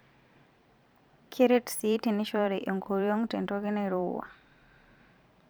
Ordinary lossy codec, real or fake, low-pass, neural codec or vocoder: none; fake; none; vocoder, 44.1 kHz, 128 mel bands every 512 samples, BigVGAN v2